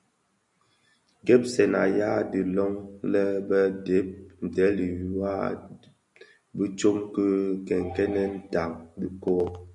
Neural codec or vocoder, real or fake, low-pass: none; real; 10.8 kHz